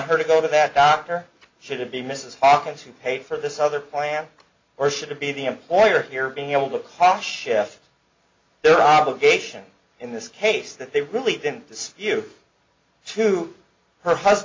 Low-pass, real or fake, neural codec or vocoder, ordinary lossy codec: 7.2 kHz; real; none; MP3, 48 kbps